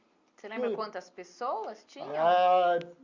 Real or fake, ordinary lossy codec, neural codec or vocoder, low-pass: real; Opus, 64 kbps; none; 7.2 kHz